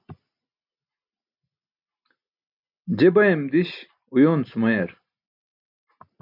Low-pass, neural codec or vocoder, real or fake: 5.4 kHz; none; real